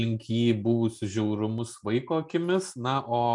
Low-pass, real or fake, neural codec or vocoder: 10.8 kHz; real; none